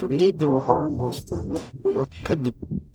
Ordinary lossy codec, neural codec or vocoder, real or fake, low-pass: none; codec, 44.1 kHz, 0.9 kbps, DAC; fake; none